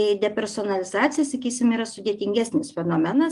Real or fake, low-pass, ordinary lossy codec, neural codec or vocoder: real; 10.8 kHz; Opus, 32 kbps; none